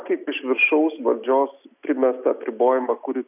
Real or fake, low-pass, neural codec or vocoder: real; 3.6 kHz; none